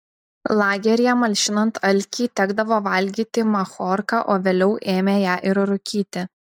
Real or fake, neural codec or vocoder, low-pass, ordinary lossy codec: real; none; 19.8 kHz; MP3, 96 kbps